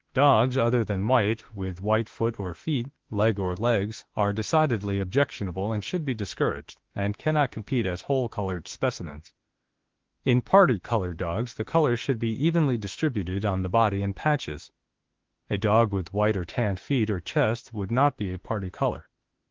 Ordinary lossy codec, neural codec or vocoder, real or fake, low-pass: Opus, 16 kbps; autoencoder, 48 kHz, 32 numbers a frame, DAC-VAE, trained on Japanese speech; fake; 7.2 kHz